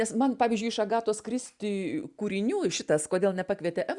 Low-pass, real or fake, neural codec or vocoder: 10.8 kHz; real; none